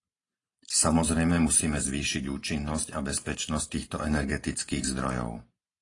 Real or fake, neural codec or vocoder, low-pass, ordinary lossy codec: fake; vocoder, 44.1 kHz, 128 mel bands every 512 samples, BigVGAN v2; 10.8 kHz; AAC, 32 kbps